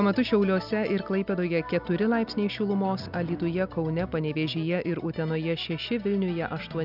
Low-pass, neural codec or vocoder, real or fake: 5.4 kHz; none; real